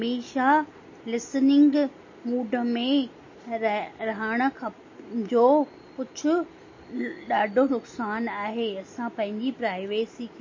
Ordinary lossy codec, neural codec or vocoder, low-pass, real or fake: MP3, 32 kbps; none; 7.2 kHz; real